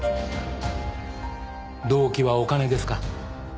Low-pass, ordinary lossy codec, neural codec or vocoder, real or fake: none; none; none; real